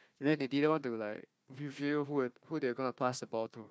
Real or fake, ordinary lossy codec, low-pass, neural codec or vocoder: fake; none; none; codec, 16 kHz, 1 kbps, FunCodec, trained on Chinese and English, 50 frames a second